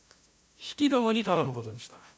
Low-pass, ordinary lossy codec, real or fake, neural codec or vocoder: none; none; fake; codec, 16 kHz, 0.5 kbps, FunCodec, trained on LibriTTS, 25 frames a second